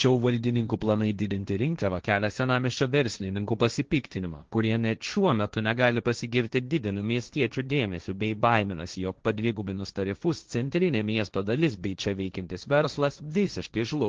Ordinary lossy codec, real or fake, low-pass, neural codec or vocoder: Opus, 16 kbps; fake; 7.2 kHz; codec, 16 kHz, 1.1 kbps, Voila-Tokenizer